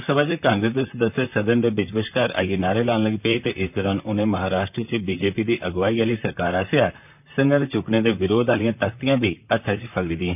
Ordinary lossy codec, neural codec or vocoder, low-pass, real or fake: none; vocoder, 44.1 kHz, 128 mel bands, Pupu-Vocoder; 3.6 kHz; fake